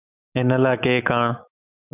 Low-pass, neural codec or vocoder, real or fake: 3.6 kHz; autoencoder, 48 kHz, 128 numbers a frame, DAC-VAE, trained on Japanese speech; fake